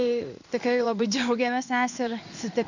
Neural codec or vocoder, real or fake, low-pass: codec, 16 kHz in and 24 kHz out, 1 kbps, XY-Tokenizer; fake; 7.2 kHz